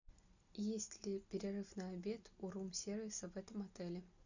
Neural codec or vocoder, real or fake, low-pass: none; real; 7.2 kHz